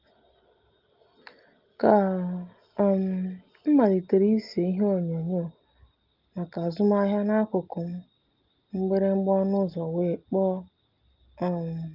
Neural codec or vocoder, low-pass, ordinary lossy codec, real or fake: none; 5.4 kHz; Opus, 32 kbps; real